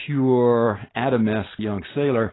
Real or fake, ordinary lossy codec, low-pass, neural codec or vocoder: real; AAC, 16 kbps; 7.2 kHz; none